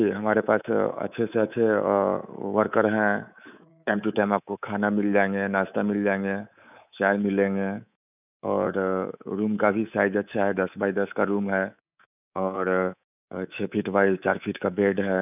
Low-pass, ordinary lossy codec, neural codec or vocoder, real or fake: 3.6 kHz; none; codec, 24 kHz, 3.1 kbps, DualCodec; fake